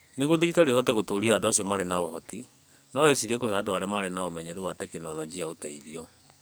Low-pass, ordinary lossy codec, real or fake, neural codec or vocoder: none; none; fake; codec, 44.1 kHz, 2.6 kbps, SNAC